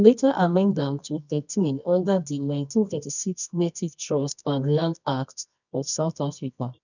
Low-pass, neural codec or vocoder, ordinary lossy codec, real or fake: 7.2 kHz; codec, 24 kHz, 0.9 kbps, WavTokenizer, medium music audio release; none; fake